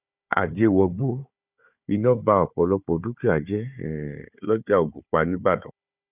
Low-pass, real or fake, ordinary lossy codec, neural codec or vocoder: 3.6 kHz; fake; none; codec, 16 kHz, 4 kbps, FunCodec, trained on Chinese and English, 50 frames a second